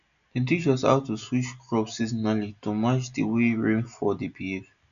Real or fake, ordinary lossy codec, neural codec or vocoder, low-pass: real; none; none; 7.2 kHz